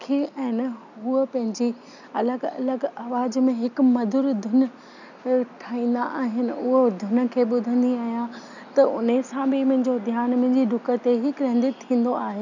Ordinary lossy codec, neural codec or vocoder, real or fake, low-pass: none; none; real; 7.2 kHz